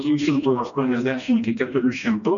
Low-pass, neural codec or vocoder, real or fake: 7.2 kHz; codec, 16 kHz, 1 kbps, FreqCodec, smaller model; fake